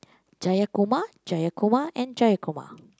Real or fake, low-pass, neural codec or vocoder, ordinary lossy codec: real; none; none; none